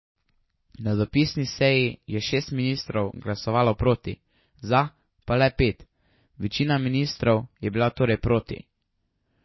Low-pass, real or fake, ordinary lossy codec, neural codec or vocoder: 7.2 kHz; real; MP3, 24 kbps; none